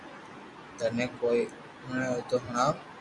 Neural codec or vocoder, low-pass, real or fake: none; 10.8 kHz; real